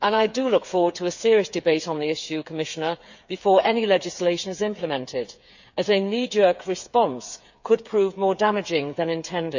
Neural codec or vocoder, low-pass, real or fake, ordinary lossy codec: codec, 16 kHz, 8 kbps, FreqCodec, smaller model; 7.2 kHz; fake; none